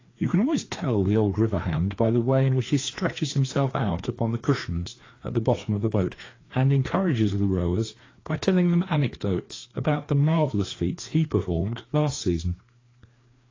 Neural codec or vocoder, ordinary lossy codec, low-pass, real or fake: codec, 16 kHz, 2 kbps, FreqCodec, larger model; AAC, 32 kbps; 7.2 kHz; fake